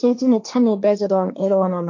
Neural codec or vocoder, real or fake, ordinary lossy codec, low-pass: codec, 16 kHz, 1.1 kbps, Voila-Tokenizer; fake; MP3, 48 kbps; 7.2 kHz